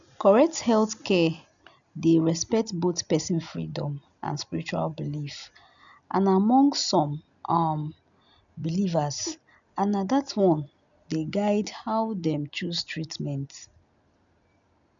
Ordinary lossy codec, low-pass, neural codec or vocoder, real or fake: none; 7.2 kHz; none; real